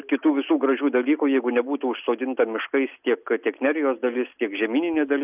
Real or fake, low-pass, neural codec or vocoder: real; 3.6 kHz; none